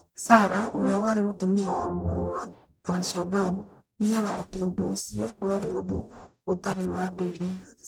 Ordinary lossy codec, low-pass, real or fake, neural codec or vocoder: none; none; fake; codec, 44.1 kHz, 0.9 kbps, DAC